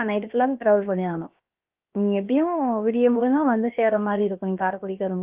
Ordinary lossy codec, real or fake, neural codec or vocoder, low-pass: Opus, 32 kbps; fake; codec, 16 kHz, 0.7 kbps, FocalCodec; 3.6 kHz